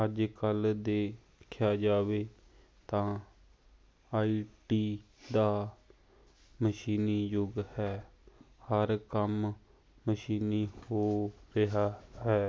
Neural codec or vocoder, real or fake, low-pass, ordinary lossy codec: none; real; none; none